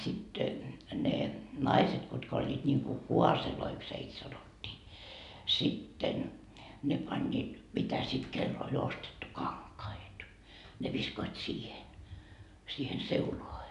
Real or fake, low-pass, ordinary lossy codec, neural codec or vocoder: real; 10.8 kHz; none; none